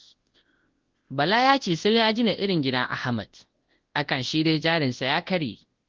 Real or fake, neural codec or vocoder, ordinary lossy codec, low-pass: fake; codec, 24 kHz, 0.9 kbps, WavTokenizer, large speech release; Opus, 16 kbps; 7.2 kHz